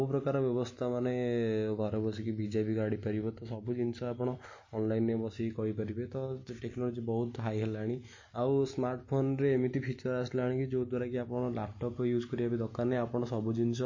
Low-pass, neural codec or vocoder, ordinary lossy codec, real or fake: 7.2 kHz; none; MP3, 32 kbps; real